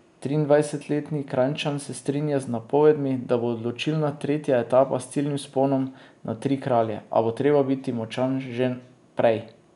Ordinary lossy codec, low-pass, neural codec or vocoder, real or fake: none; 10.8 kHz; none; real